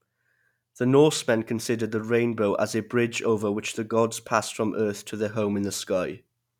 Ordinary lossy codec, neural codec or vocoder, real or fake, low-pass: none; none; real; 19.8 kHz